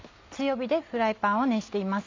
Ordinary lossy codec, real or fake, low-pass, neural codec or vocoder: MP3, 64 kbps; real; 7.2 kHz; none